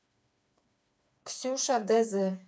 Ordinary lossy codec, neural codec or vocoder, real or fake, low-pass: none; codec, 16 kHz, 4 kbps, FreqCodec, smaller model; fake; none